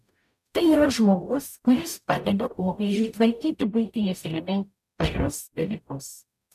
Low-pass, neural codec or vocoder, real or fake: 14.4 kHz; codec, 44.1 kHz, 0.9 kbps, DAC; fake